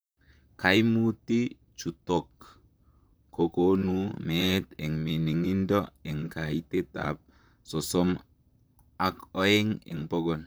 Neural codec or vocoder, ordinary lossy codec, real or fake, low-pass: vocoder, 44.1 kHz, 128 mel bands, Pupu-Vocoder; none; fake; none